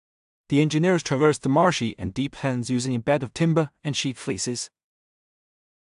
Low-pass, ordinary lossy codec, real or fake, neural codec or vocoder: 10.8 kHz; AAC, 96 kbps; fake; codec, 16 kHz in and 24 kHz out, 0.4 kbps, LongCat-Audio-Codec, two codebook decoder